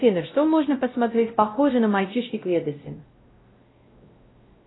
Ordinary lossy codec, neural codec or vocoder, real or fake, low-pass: AAC, 16 kbps; codec, 16 kHz, 0.5 kbps, X-Codec, WavLM features, trained on Multilingual LibriSpeech; fake; 7.2 kHz